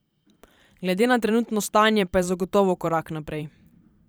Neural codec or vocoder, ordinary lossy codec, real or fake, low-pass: vocoder, 44.1 kHz, 128 mel bands every 512 samples, BigVGAN v2; none; fake; none